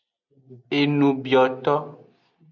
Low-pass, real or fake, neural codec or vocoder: 7.2 kHz; real; none